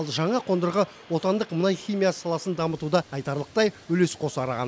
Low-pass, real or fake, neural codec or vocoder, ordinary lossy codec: none; real; none; none